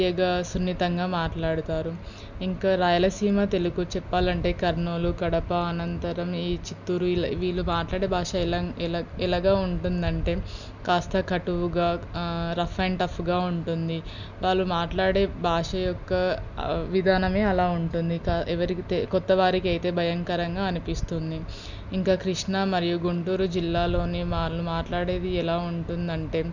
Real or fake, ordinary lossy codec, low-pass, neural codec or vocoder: real; none; 7.2 kHz; none